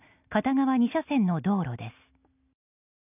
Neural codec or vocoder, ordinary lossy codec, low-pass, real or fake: none; none; 3.6 kHz; real